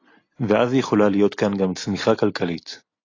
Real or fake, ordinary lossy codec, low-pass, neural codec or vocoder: real; AAC, 32 kbps; 7.2 kHz; none